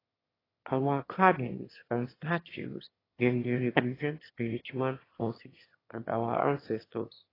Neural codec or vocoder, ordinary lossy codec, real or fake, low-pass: autoencoder, 22.05 kHz, a latent of 192 numbers a frame, VITS, trained on one speaker; AAC, 24 kbps; fake; 5.4 kHz